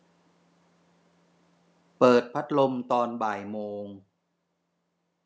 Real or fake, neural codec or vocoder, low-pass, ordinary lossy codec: real; none; none; none